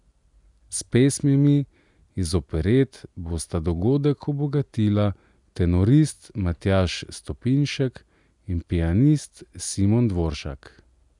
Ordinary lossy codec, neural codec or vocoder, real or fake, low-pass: none; none; real; 10.8 kHz